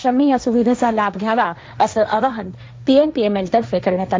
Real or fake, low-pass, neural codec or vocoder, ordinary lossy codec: fake; none; codec, 16 kHz, 1.1 kbps, Voila-Tokenizer; none